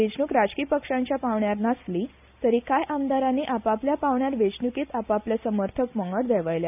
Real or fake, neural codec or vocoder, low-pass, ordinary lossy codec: real; none; 3.6 kHz; none